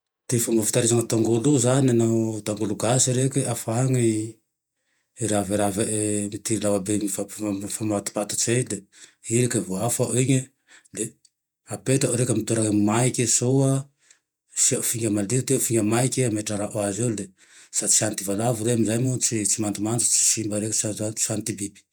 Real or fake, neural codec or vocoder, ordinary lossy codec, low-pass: fake; vocoder, 48 kHz, 128 mel bands, Vocos; none; none